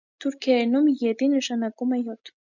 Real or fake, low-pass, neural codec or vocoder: real; 7.2 kHz; none